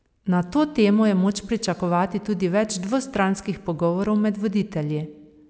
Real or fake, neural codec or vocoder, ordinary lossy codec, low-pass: real; none; none; none